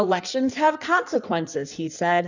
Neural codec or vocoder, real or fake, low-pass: codec, 16 kHz in and 24 kHz out, 1.1 kbps, FireRedTTS-2 codec; fake; 7.2 kHz